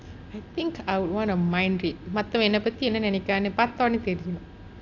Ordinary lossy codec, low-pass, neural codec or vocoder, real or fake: AAC, 48 kbps; 7.2 kHz; none; real